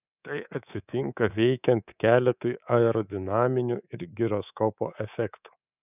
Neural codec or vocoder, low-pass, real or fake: codec, 24 kHz, 3.1 kbps, DualCodec; 3.6 kHz; fake